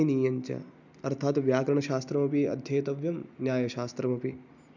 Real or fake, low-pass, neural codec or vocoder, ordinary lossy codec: real; 7.2 kHz; none; none